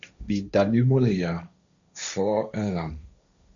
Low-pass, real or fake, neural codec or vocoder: 7.2 kHz; fake; codec, 16 kHz, 1.1 kbps, Voila-Tokenizer